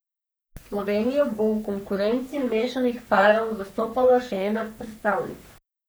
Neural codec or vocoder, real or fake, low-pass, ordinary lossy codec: codec, 44.1 kHz, 3.4 kbps, Pupu-Codec; fake; none; none